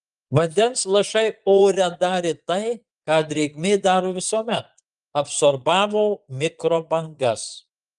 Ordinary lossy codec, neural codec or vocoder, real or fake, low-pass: Opus, 32 kbps; vocoder, 22.05 kHz, 80 mel bands, Vocos; fake; 9.9 kHz